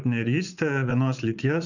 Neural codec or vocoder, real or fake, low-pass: vocoder, 44.1 kHz, 80 mel bands, Vocos; fake; 7.2 kHz